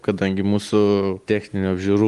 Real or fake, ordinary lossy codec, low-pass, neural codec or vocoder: real; Opus, 24 kbps; 10.8 kHz; none